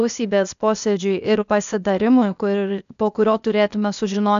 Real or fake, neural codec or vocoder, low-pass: fake; codec, 16 kHz, 0.8 kbps, ZipCodec; 7.2 kHz